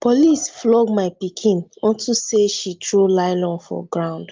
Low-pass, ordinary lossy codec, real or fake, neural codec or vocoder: 7.2 kHz; Opus, 32 kbps; real; none